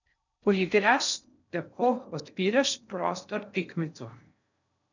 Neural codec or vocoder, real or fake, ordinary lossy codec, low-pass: codec, 16 kHz in and 24 kHz out, 0.6 kbps, FocalCodec, streaming, 4096 codes; fake; none; 7.2 kHz